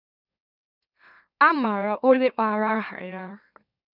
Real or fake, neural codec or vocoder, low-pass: fake; autoencoder, 44.1 kHz, a latent of 192 numbers a frame, MeloTTS; 5.4 kHz